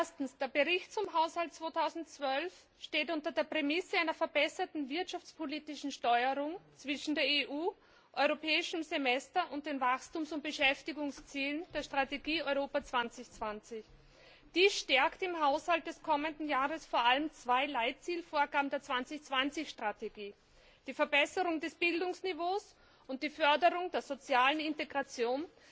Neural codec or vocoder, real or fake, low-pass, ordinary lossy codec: none; real; none; none